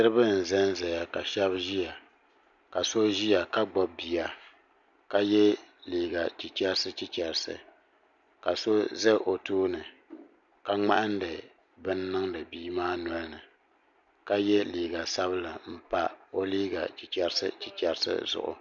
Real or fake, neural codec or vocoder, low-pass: real; none; 7.2 kHz